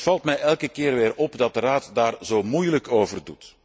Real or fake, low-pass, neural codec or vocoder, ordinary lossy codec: real; none; none; none